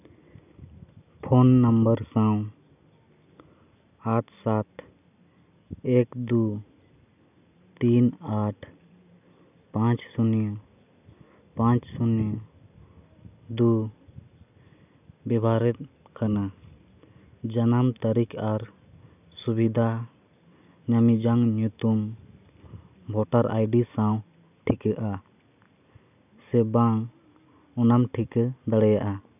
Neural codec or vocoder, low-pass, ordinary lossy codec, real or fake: none; 3.6 kHz; none; real